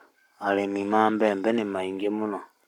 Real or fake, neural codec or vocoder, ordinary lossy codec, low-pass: fake; codec, 44.1 kHz, 7.8 kbps, Pupu-Codec; none; 19.8 kHz